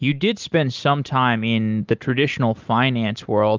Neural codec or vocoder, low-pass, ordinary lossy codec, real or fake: none; 7.2 kHz; Opus, 24 kbps; real